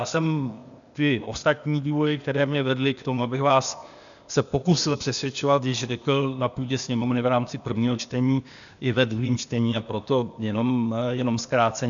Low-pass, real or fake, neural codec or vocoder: 7.2 kHz; fake; codec, 16 kHz, 0.8 kbps, ZipCodec